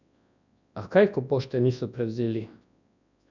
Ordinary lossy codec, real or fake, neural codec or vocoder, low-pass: none; fake; codec, 24 kHz, 0.9 kbps, WavTokenizer, large speech release; 7.2 kHz